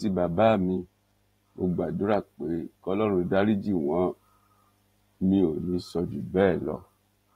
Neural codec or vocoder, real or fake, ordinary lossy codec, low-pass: vocoder, 44.1 kHz, 128 mel bands every 512 samples, BigVGAN v2; fake; AAC, 48 kbps; 19.8 kHz